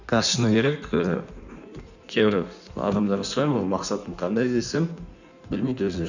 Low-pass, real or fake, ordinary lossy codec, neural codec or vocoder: 7.2 kHz; fake; none; codec, 16 kHz in and 24 kHz out, 1.1 kbps, FireRedTTS-2 codec